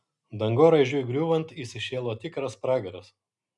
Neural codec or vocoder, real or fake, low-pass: none; real; 10.8 kHz